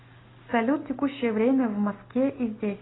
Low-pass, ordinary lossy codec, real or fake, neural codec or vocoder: 7.2 kHz; AAC, 16 kbps; real; none